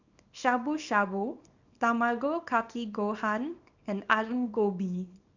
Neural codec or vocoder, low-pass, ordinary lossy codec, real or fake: codec, 24 kHz, 0.9 kbps, WavTokenizer, small release; 7.2 kHz; none; fake